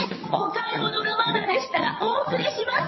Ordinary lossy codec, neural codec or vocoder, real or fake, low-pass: MP3, 24 kbps; vocoder, 22.05 kHz, 80 mel bands, HiFi-GAN; fake; 7.2 kHz